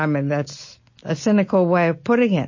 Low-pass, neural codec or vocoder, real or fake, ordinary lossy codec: 7.2 kHz; none; real; MP3, 32 kbps